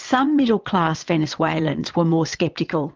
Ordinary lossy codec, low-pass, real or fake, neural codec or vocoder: Opus, 24 kbps; 7.2 kHz; fake; vocoder, 22.05 kHz, 80 mel bands, WaveNeXt